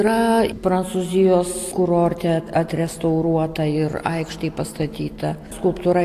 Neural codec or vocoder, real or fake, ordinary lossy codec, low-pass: none; real; AAC, 64 kbps; 14.4 kHz